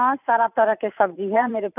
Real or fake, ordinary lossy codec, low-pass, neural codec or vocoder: fake; none; 3.6 kHz; vocoder, 44.1 kHz, 128 mel bands every 512 samples, BigVGAN v2